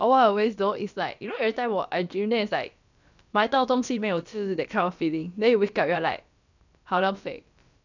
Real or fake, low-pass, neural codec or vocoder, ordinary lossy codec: fake; 7.2 kHz; codec, 16 kHz, about 1 kbps, DyCAST, with the encoder's durations; none